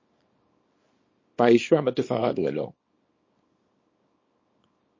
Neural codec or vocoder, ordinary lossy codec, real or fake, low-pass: codec, 24 kHz, 0.9 kbps, WavTokenizer, small release; MP3, 32 kbps; fake; 7.2 kHz